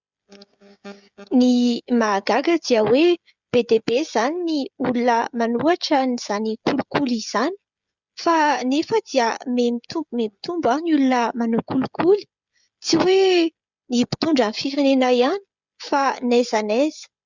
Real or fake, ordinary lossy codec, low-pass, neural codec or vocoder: fake; Opus, 64 kbps; 7.2 kHz; codec, 16 kHz, 16 kbps, FreqCodec, smaller model